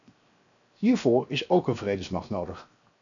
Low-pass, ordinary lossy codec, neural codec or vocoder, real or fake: 7.2 kHz; MP3, 96 kbps; codec, 16 kHz, 0.7 kbps, FocalCodec; fake